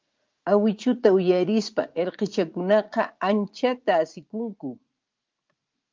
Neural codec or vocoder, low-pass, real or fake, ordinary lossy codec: vocoder, 44.1 kHz, 80 mel bands, Vocos; 7.2 kHz; fake; Opus, 24 kbps